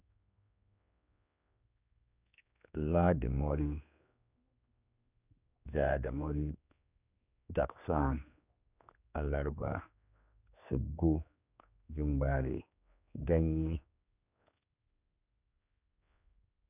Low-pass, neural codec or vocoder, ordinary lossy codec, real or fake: 3.6 kHz; codec, 16 kHz, 2 kbps, X-Codec, HuBERT features, trained on general audio; none; fake